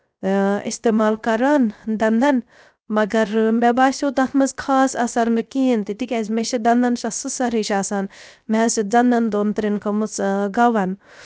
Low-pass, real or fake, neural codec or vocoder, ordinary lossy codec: none; fake; codec, 16 kHz, 0.3 kbps, FocalCodec; none